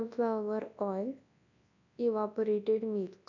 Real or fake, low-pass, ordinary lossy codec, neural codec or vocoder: fake; 7.2 kHz; none; codec, 24 kHz, 0.9 kbps, WavTokenizer, large speech release